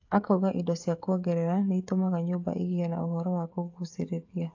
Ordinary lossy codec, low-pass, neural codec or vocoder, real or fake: AAC, 48 kbps; 7.2 kHz; codec, 16 kHz, 16 kbps, FreqCodec, smaller model; fake